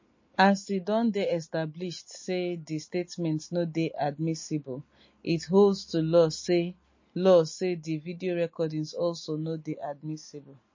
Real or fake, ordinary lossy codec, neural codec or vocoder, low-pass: real; MP3, 32 kbps; none; 7.2 kHz